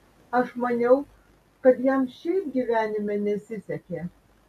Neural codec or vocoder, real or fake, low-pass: none; real; 14.4 kHz